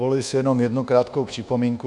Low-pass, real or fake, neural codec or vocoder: 10.8 kHz; fake; codec, 24 kHz, 1.2 kbps, DualCodec